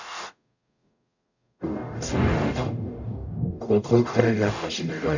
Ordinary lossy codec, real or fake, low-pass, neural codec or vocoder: none; fake; 7.2 kHz; codec, 44.1 kHz, 0.9 kbps, DAC